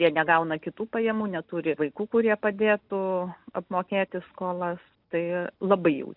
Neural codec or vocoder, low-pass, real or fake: none; 5.4 kHz; real